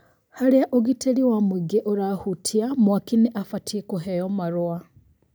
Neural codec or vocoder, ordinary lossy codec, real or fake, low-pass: none; none; real; none